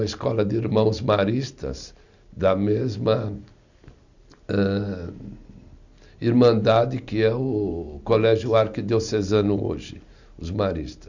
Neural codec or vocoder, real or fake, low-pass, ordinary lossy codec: none; real; 7.2 kHz; none